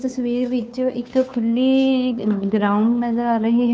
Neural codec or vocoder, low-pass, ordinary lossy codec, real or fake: codec, 16 kHz, 2 kbps, FunCodec, trained on Chinese and English, 25 frames a second; none; none; fake